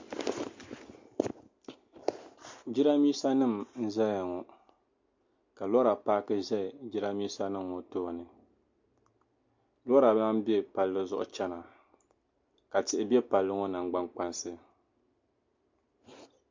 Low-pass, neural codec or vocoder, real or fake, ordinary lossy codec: 7.2 kHz; none; real; MP3, 48 kbps